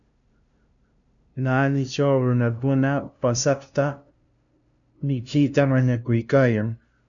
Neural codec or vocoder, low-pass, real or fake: codec, 16 kHz, 0.5 kbps, FunCodec, trained on LibriTTS, 25 frames a second; 7.2 kHz; fake